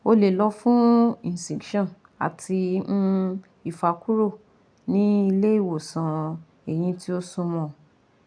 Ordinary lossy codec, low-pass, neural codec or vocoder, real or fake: none; 9.9 kHz; none; real